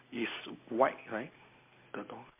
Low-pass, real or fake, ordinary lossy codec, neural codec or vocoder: 3.6 kHz; real; MP3, 24 kbps; none